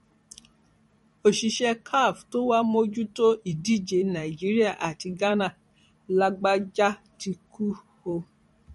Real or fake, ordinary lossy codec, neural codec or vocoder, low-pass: fake; MP3, 48 kbps; vocoder, 44.1 kHz, 128 mel bands every 256 samples, BigVGAN v2; 19.8 kHz